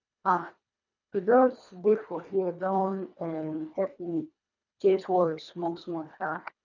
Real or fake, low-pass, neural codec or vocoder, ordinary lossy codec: fake; 7.2 kHz; codec, 24 kHz, 1.5 kbps, HILCodec; none